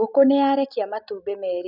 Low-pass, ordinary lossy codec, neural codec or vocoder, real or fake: 5.4 kHz; none; none; real